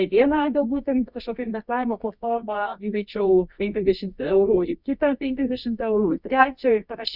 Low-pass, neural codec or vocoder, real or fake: 5.4 kHz; codec, 24 kHz, 0.9 kbps, WavTokenizer, medium music audio release; fake